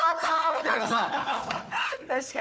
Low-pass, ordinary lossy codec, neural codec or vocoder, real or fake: none; none; codec, 16 kHz, 4 kbps, FunCodec, trained on Chinese and English, 50 frames a second; fake